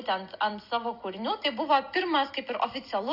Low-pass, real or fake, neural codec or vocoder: 5.4 kHz; real; none